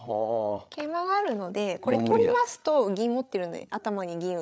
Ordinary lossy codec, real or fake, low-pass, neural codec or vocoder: none; fake; none; codec, 16 kHz, 8 kbps, FreqCodec, larger model